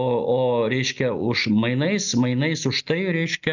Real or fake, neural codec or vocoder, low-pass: real; none; 7.2 kHz